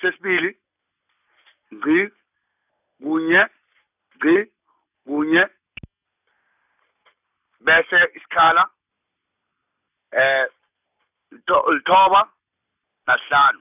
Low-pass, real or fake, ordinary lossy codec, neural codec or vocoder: 3.6 kHz; real; none; none